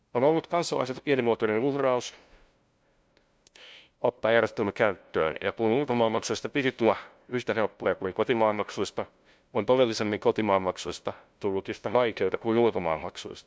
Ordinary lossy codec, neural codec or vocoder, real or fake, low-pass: none; codec, 16 kHz, 0.5 kbps, FunCodec, trained on LibriTTS, 25 frames a second; fake; none